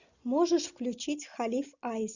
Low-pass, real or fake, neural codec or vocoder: 7.2 kHz; real; none